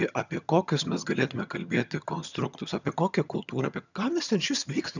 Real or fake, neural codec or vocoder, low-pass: fake; vocoder, 22.05 kHz, 80 mel bands, HiFi-GAN; 7.2 kHz